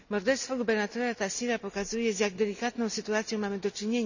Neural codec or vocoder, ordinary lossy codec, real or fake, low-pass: none; none; real; 7.2 kHz